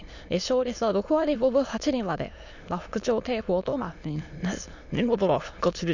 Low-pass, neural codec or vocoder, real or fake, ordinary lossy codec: 7.2 kHz; autoencoder, 22.05 kHz, a latent of 192 numbers a frame, VITS, trained on many speakers; fake; none